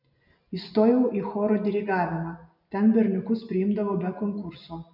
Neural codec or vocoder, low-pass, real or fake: none; 5.4 kHz; real